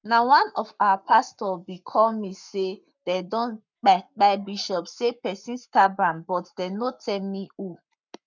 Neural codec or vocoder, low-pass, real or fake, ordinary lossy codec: codec, 44.1 kHz, 7.8 kbps, Pupu-Codec; 7.2 kHz; fake; none